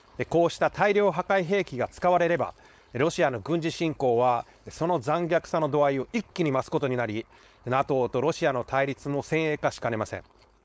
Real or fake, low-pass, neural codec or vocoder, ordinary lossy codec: fake; none; codec, 16 kHz, 4.8 kbps, FACodec; none